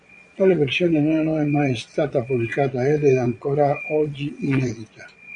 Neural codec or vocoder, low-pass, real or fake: vocoder, 22.05 kHz, 80 mel bands, Vocos; 9.9 kHz; fake